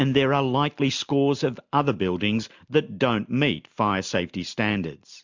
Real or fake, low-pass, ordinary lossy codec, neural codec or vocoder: real; 7.2 kHz; MP3, 64 kbps; none